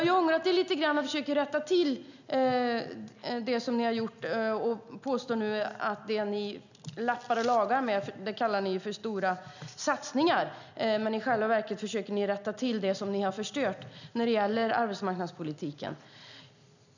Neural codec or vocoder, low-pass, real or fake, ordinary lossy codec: none; 7.2 kHz; real; none